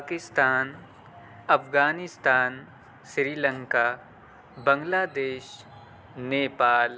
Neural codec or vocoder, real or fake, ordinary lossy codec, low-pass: none; real; none; none